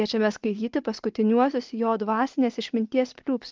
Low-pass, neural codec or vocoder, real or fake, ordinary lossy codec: 7.2 kHz; none; real; Opus, 32 kbps